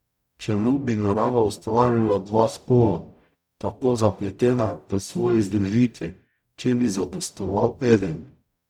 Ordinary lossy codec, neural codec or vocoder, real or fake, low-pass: none; codec, 44.1 kHz, 0.9 kbps, DAC; fake; 19.8 kHz